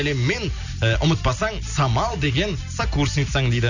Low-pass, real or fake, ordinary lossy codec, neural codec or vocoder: 7.2 kHz; real; none; none